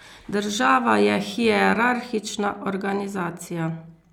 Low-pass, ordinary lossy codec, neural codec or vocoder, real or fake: 19.8 kHz; none; none; real